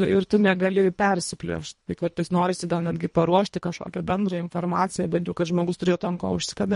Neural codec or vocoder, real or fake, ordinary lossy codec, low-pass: codec, 24 kHz, 1.5 kbps, HILCodec; fake; MP3, 48 kbps; 10.8 kHz